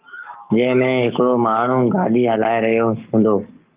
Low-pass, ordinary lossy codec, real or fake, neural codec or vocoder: 3.6 kHz; Opus, 32 kbps; fake; codec, 44.1 kHz, 7.8 kbps, Pupu-Codec